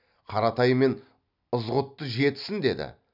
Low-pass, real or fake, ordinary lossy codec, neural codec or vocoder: 5.4 kHz; real; none; none